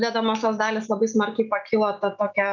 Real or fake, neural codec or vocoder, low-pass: real; none; 7.2 kHz